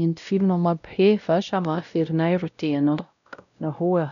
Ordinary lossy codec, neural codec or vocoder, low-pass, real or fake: none; codec, 16 kHz, 0.5 kbps, X-Codec, WavLM features, trained on Multilingual LibriSpeech; 7.2 kHz; fake